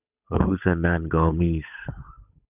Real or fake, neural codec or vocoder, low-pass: fake; codec, 16 kHz, 8 kbps, FunCodec, trained on Chinese and English, 25 frames a second; 3.6 kHz